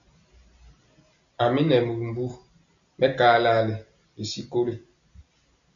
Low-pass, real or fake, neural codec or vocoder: 7.2 kHz; real; none